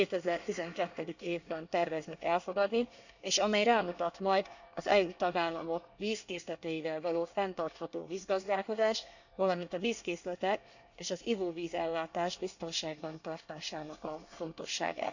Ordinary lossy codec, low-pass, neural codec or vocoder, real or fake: none; 7.2 kHz; codec, 24 kHz, 1 kbps, SNAC; fake